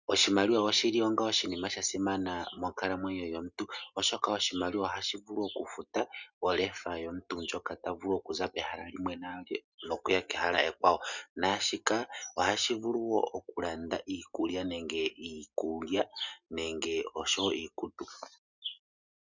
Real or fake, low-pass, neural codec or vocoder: real; 7.2 kHz; none